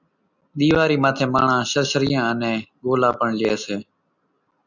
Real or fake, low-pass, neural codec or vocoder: real; 7.2 kHz; none